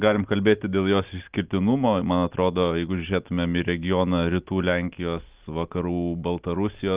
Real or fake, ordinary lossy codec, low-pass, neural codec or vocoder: real; Opus, 32 kbps; 3.6 kHz; none